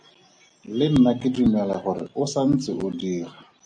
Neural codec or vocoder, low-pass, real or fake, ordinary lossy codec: none; 9.9 kHz; real; MP3, 96 kbps